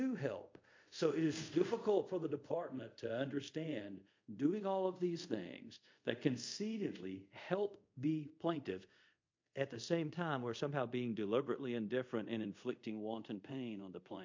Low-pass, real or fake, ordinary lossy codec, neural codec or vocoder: 7.2 kHz; fake; MP3, 48 kbps; codec, 24 kHz, 0.5 kbps, DualCodec